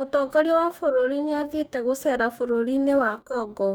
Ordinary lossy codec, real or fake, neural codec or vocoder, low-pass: none; fake; codec, 44.1 kHz, 2.6 kbps, DAC; none